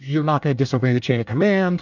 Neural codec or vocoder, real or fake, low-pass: codec, 24 kHz, 1 kbps, SNAC; fake; 7.2 kHz